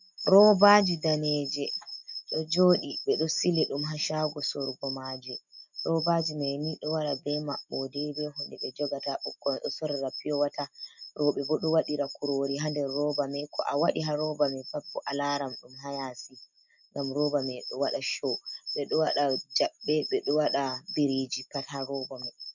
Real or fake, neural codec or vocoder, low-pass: real; none; 7.2 kHz